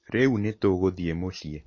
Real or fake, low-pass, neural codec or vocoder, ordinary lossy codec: real; 7.2 kHz; none; MP3, 32 kbps